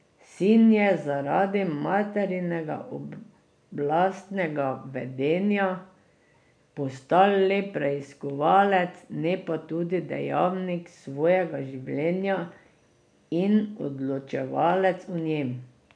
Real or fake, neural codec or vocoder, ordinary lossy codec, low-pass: real; none; none; 9.9 kHz